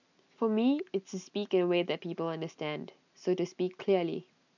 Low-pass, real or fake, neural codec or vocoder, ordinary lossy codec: 7.2 kHz; real; none; none